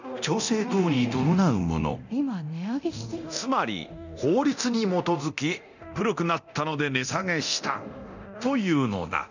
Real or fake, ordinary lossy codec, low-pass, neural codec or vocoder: fake; none; 7.2 kHz; codec, 24 kHz, 0.9 kbps, DualCodec